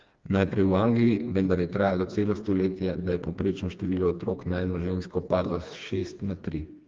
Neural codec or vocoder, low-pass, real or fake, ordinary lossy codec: codec, 16 kHz, 2 kbps, FreqCodec, smaller model; 7.2 kHz; fake; Opus, 32 kbps